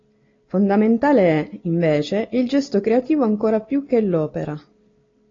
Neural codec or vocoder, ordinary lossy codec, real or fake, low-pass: none; AAC, 32 kbps; real; 7.2 kHz